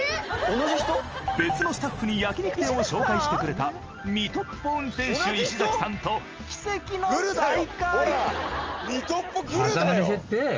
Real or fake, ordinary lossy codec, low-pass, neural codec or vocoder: real; Opus, 24 kbps; 7.2 kHz; none